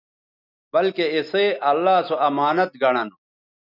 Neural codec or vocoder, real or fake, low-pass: none; real; 5.4 kHz